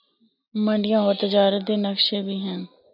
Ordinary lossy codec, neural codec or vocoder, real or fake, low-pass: AAC, 48 kbps; none; real; 5.4 kHz